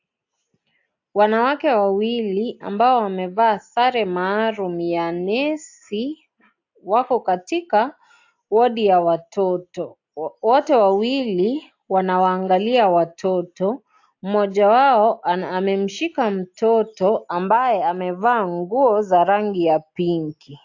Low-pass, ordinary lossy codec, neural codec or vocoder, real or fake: 7.2 kHz; AAC, 48 kbps; none; real